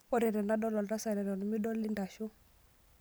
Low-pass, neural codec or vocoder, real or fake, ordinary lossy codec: none; none; real; none